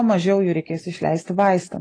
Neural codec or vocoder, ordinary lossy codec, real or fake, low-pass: none; AAC, 32 kbps; real; 9.9 kHz